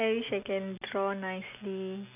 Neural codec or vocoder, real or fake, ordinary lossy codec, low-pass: none; real; none; 3.6 kHz